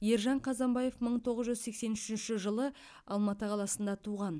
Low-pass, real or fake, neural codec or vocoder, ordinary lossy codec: none; real; none; none